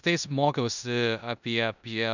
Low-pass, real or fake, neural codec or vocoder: 7.2 kHz; fake; codec, 16 kHz in and 24 kHz out, 0.9 kbps, LongCat-Audio-Codec, four codebook decoder